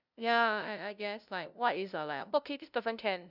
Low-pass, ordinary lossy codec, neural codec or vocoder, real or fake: 5.4 kHz; none; codec, 16 kHz, 0.5 kbps, FunCodec, trained on LibriTTS, 25 frames a second; fake